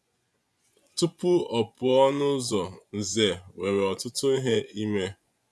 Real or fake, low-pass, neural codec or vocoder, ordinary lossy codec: fake; none; vocoder, 24 kHz, 100 mel bands, Vocos; none